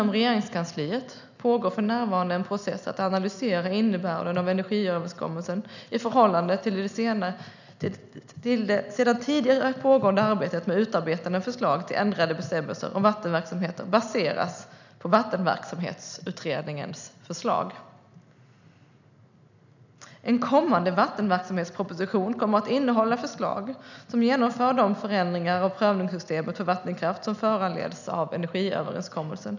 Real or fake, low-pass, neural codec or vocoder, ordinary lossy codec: real; 7.2 kHz; none; none